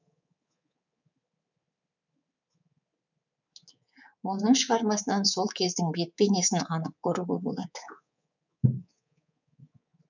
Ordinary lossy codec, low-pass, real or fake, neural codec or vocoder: none; 7.2 kHz; fake; codec, 24 kHz, 3.1 kbps, DualCodec